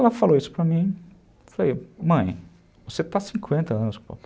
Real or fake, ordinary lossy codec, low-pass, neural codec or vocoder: real; none; none; none